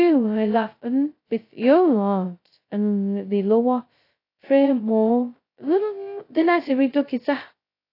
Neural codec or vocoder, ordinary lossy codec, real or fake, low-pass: codec, 16 kHz, 0.2 kbps, FocalCodec; AAC, 32 kbps; fake; 5.4 kHz